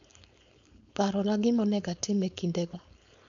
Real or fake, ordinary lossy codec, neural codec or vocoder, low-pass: fake; none; codec, 16 kHz, 4.8 kbps, FACodec; 7.2 kHz